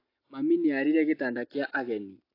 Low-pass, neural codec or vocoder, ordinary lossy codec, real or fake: 5.4 kHz; none; AAC, 32 kbps; real